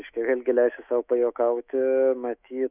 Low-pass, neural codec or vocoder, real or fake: 3.6 kHz; autoencoder, 48 kHz, 128 numbers a frame, DAC-VAE, trained on Japanese speech; fake